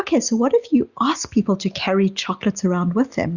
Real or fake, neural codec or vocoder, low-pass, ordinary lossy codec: real; none; 7.2 kHz; Opus, 64 kbps